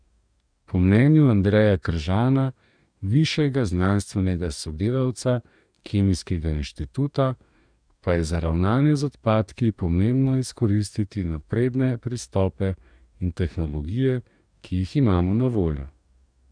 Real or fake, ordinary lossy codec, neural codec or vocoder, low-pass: fake; none; codec, 44.1 kHz, 2.6 kbps, DAC; 9.9 kHz